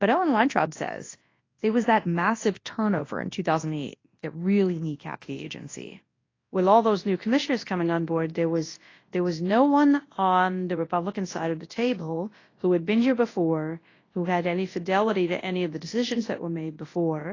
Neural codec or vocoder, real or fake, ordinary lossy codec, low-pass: codec, 24 kHz, 0.9 kbps, WavTokenizer, large speech release; fake; AAC, 32 kbps; 7.2 kHz